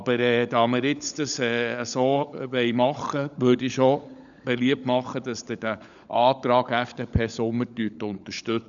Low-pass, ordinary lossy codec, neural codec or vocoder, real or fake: 7.2 kHz; none; codec, 16 kHz, 16 kbps, FunCodec, trained on LibriTTS, 50 frames a second; fake